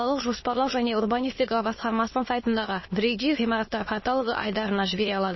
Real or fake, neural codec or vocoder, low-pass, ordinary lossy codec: fake; autoencoder, 22.05 kHz, a latent of 192 numbers a frame, VITS, trained on many speakers; 7.2 kHz; MP3, 24 kbps